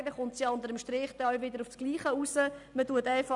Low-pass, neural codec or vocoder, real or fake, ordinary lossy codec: 10.8 kHz; none; real; none